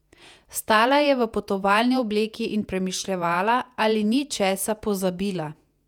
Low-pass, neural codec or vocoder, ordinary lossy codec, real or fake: 19.8 kHz; vocoder, 48 kHz, 128 mel bands, Vocos; none; fake